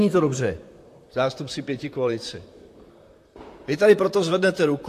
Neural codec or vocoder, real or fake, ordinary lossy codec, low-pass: vocoder, 44.1 kHz, 128 mel bands, Pupu-Vocoder; fake; AAC, 64 kbps; 14.4 kHz